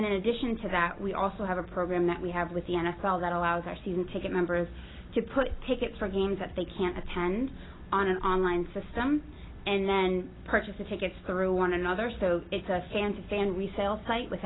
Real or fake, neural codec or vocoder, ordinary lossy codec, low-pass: real; none; AAC, 16 kbps; 7.2 kHz